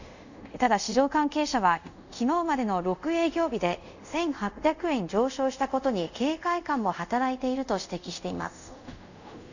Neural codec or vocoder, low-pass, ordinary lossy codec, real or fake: codec, 24 kHz, 0.5 kbps, DualCodec; 7.2 kHz; none; fake